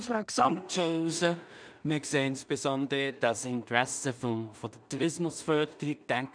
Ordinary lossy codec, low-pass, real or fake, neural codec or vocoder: none; 9.9 kHz; fake; codec, 16 kHz in and 24 kHz out, 0.4 kbps, LongCat-Audio-Codec, two codebook decoder